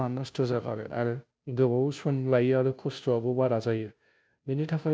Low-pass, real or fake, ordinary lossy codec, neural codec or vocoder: none; fake; none; codec, 16 kHz, 0.5 kbps, FunCodec, trained on Chinese and English, 25 frames a second